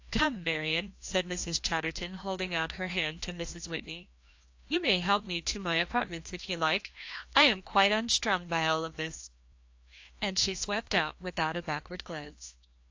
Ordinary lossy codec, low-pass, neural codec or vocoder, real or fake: AAC, 48 kbps; 7.2 kHz; codec, 16 kHz, 1 kbps, FreqCodec, larger model; fake